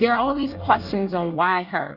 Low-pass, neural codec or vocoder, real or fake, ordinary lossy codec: 5.4 kHz; codec, 24 kHz, 1 kbps, SNAC; fake; Opus, 64 kbps